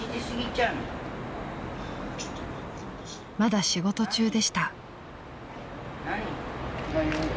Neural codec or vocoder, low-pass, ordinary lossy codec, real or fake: none; none; none; real